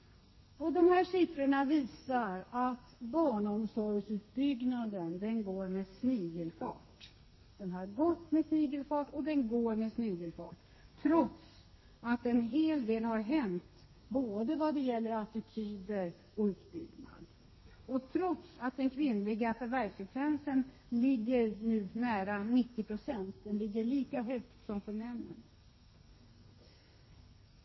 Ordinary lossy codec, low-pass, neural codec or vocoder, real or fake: MP3, 24 kbps; 7.2 kHz; codec, 32 kHz, 1.9 kbps, SNAC; fake